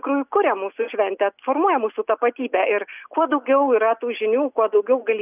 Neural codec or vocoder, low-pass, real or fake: none; 3.6 kHz; real